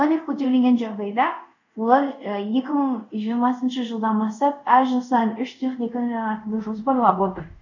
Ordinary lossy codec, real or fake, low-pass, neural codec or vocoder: none; fake; 7.2 kHz; codec, 24 kHz, 0.5 kbps, DualCodec